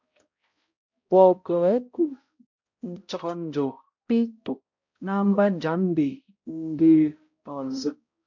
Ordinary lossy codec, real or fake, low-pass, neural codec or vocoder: MP3, 48 kbps; fake; 7.2 kHz; codec, 16 kHz, 0.5 kbps, X-Codec, HuBERT features, trained on balanced general audio